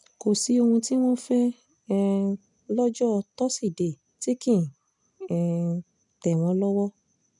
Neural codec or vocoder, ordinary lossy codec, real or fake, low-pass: none; none; real; 10.8 kHz